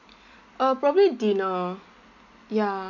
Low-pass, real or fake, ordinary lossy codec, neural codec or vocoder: 7.2 kHz; real; none; none